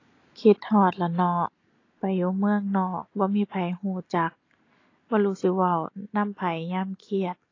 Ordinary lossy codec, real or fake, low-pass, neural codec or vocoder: AAC, 32 kbps; real; 7.2 kHz; none